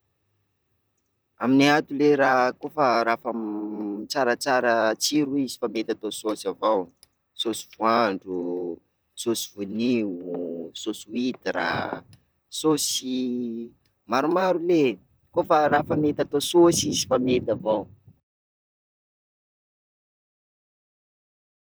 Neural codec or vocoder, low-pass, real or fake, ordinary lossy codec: vocoder, 44.1 kHz, 128 mel bands, Pupu-Vocoder; none; fake; none